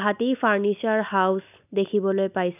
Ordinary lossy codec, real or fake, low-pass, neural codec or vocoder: none; real; 3.6 kHz; none